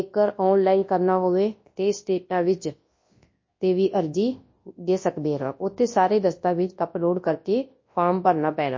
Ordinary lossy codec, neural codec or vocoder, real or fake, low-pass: MP3, 32 kbps; codec, 24 kHz, 0.9 kbps, WavTokenizer, large speech release; fake; 7.2 kHz